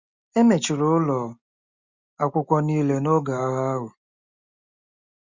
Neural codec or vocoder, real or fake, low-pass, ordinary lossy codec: none; real; 7.2 kHz; Opus, 64 kbps